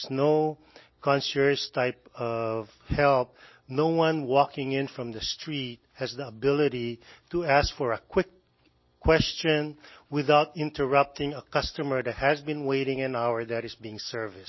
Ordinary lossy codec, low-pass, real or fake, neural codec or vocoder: MP3, 24 kbps; 7.2 kHz; real; none